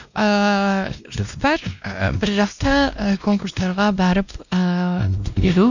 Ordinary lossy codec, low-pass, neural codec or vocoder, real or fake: none; 7.2 kHz; codec, 16 kHz, 1 kbps, X-Codec, WavLM features, trained on Multilingual LibriSpeech; fake